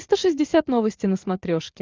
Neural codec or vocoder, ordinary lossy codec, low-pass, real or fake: none; Opus, 24 kbps; 7.2 kHz; real